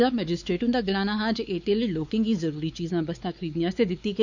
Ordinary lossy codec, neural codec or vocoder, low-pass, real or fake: none; codec, 16 kHz, 4 kbps, X-Codec, WavLM features, trained on Multilingual LibriSpeech; 7.2 kHz; fake